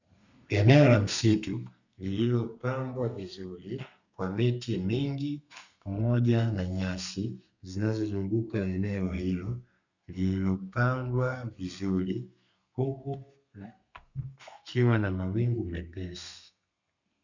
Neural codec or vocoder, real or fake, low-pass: codec, 32 kHz, 1.9 kbps, SNAC; fake; 7.2 kHz